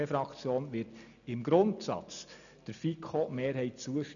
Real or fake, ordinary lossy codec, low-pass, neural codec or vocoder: real; none; 7.2 kHz; none